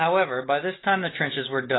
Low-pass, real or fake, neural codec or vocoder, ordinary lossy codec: 7.2 kHz; real; none; AAC, 16 kbps